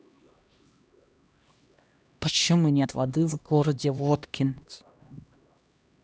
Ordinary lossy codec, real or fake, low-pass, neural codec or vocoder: none; fake; none; codec, 16 kHz, 1 kbps, X-Codec, HuBERT features, trained on LibriSpeech